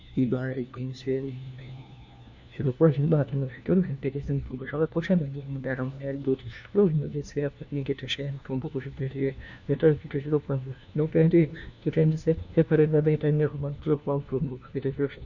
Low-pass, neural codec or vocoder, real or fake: 7.2 kHz; codec, 16 kHz, 1 kbps, FunCodec, trained on LibriTTS, 50 frames a second; fake